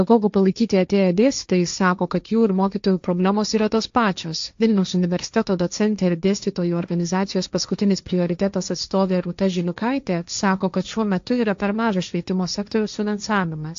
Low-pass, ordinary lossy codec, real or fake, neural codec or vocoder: 7.2 kHz; AAC, 64 kbps; fake; codec, 16 kHz, 1.1 kbps, Voila-Tokenizer